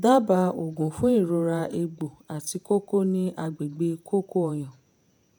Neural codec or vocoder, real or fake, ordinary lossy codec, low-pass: vocoder, 48 kHz, 128 mel bands, Vocos; fake; none; none